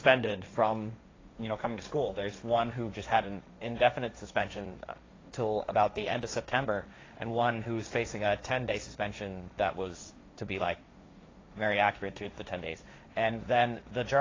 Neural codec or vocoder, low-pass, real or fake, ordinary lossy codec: codec, 16 kHz, 1.1 kbps, Voila-Tokenizer; 7.2 kHz; fake; AAC, 32 kbps